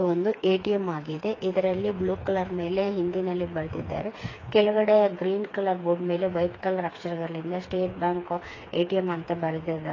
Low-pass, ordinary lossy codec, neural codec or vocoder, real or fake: 7.2 kHz; AAC, 32 kbps; codec, 16 kHz, 4 kbps, FreqCodec, smaller model; fake